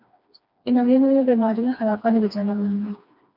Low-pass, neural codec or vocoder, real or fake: 5.4 kHz; codec, 16 kHz, 2 kbps, FreqCodec, smaller model; fake